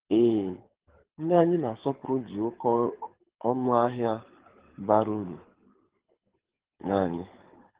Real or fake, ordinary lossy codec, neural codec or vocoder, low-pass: fake; Opus, 16 kbps; codec, 24 kHz, 6 kbps, HILCodec; 3.6 kHz